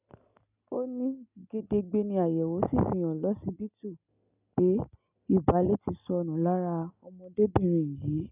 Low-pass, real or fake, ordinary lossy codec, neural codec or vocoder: 3.6 kHz; real; none; none